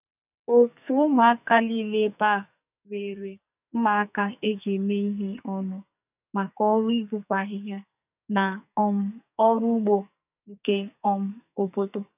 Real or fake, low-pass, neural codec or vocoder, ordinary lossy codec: fake; 3.6 kHz; codec, 44.1 kHz, 2.6 kbps, SNAC; none